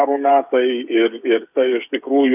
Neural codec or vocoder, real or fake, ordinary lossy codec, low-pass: codec, 16 kHz, 8 kbps, FreqCodec, smaller model; fake; AAC, 32 kbps; 3.6 kHz